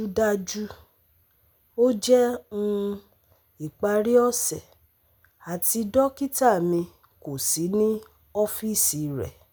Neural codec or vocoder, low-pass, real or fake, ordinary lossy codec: none; none; real; none